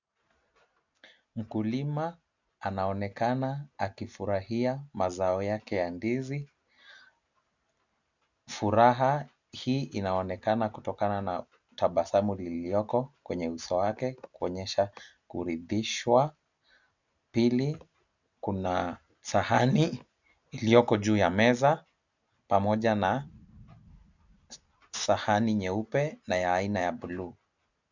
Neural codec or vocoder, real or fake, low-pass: none; real; 7.2 kHz